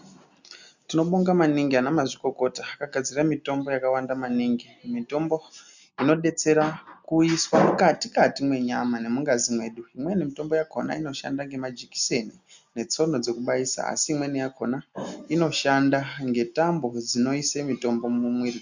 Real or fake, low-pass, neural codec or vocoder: real; 7.2 kHz; none